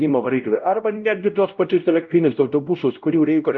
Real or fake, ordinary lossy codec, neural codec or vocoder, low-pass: fake; Opus, 24 kbps; codec, 16 kHz, 0.5 kbps, X-Codec, WavLM features, trained on Multilingual LibriSpeech; 7.2 kHz